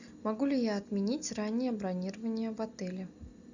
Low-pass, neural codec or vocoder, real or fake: 7.2 kHz; none; real